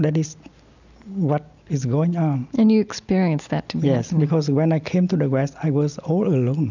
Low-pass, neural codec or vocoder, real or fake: 7.2 kHz; none; real